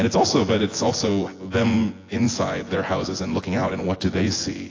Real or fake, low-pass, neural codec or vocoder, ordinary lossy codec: fake; 7.2 kHz; vocoder, 24 kHz, 100 mel bands, Vocos; AAC, 32 kbps